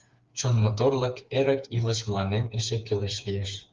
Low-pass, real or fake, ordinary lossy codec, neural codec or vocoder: 7.2 kHz; fake; Opus, 32 kbps; codec, 16 kHz, 4 kbps, FreqCodec, smaller model